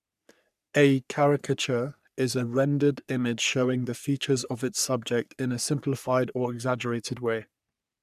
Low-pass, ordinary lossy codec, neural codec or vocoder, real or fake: 14.4 kHz; none; codec, 44.1 kHz, 3.4 kbps, Pupu-Codec; fake